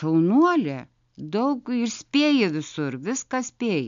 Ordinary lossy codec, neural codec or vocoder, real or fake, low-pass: MP3, 64 kbps; none; real; 7.2 kHz